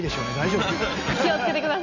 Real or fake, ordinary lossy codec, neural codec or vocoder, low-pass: real; none; none; 7.2 kHz